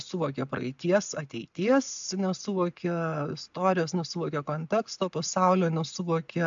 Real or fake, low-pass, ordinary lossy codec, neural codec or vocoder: real; 7.2 kHz; AAC, 64 kbps; none